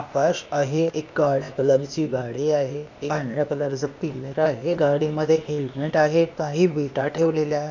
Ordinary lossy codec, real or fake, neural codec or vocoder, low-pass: none; fake; codec, 16 kHz, 0.8 kbps, ZipCodec; 7.2 kHz